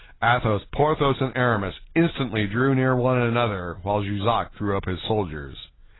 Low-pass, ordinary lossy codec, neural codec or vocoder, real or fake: 7.2 kHz; AAC, 16 kbps; none; real